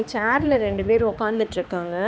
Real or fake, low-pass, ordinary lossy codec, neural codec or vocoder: fake; none; none; codec, 16 kHz, 2 kbps, X-Codec, HuBERT features, trained on balanced general audio